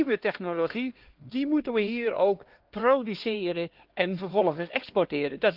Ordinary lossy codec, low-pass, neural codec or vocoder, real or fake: Opus, 16 kbps; 5.4 kHz; codec, 16 kHz, 2 kbps, X-Codec, HuBERT features, trained on LibriSpeech; fake